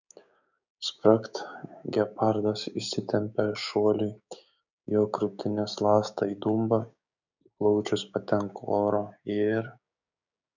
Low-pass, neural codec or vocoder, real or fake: 7.2 kHz; codec, 16 kHz, 6 kbps, DAC; fake